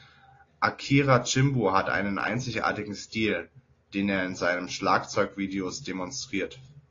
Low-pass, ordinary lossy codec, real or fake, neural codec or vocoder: 7.2 kHz; AAC, 32 kbps; real; none